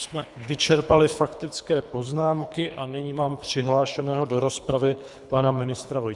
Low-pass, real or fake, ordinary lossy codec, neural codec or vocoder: 10.8 kHz; fake; Opus, 64 kbps; codec, 24 kHz, 3 kbps, HILCodec